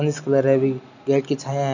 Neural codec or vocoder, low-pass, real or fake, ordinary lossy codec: none; 7.2 kHz; real; none